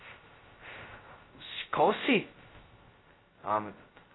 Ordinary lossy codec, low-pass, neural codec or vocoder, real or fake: AAC, 16 kbps; 7.2 kHz; codec, 16 kHz, 0.2 kbps, FocalCodec; fake